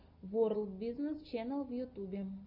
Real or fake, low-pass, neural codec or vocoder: real; 5.4 kHz; none